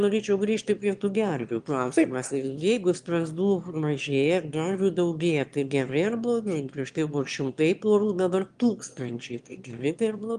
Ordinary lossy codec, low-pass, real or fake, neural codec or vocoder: Opus, 24 kbps; 9.9 kHz; fake; autoencoder, 22.05 kHz, a latent of 192 numbers a frame, VITS, trained on one speaker